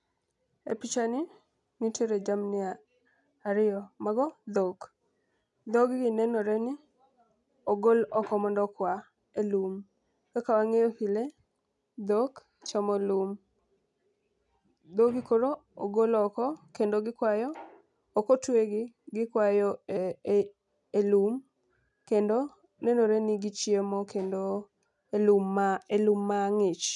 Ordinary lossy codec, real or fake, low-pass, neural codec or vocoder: none; real; 10.8 kHz; none